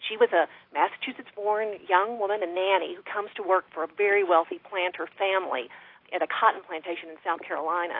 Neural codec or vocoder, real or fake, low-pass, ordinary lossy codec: none; real; 5.4 kHz; AAC, 32 kbps